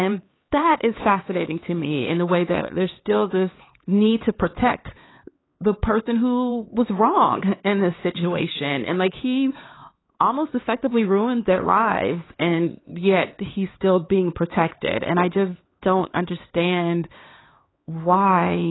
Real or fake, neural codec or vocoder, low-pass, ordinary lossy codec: fake; codec, 16 kHz, 4 kbps, X-Codec, HuBERT features, trained on LibriSpeech; 7.2 kHz; AAC, 16 kbps